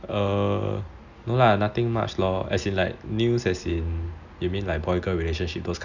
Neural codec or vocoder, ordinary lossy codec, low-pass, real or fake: none; none; 7.2 kHz; real